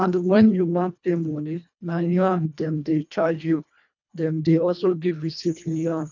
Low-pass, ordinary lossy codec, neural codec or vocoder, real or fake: 7.2 kHz; none; codec, 24 kHz, 1.5 kbps, HILCodec; fake